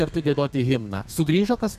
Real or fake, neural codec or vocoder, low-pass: fake; codec, 32 kHz, 1.9 kbps, SNAC; 14.4 kHz